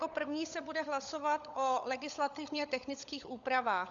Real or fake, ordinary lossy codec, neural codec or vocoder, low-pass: fake; Opus, 64 kbps; codec, 16 kHz, 16 kbps, FunCodec, trained on LibriTTS, 50 frames a second; 7.2 kHz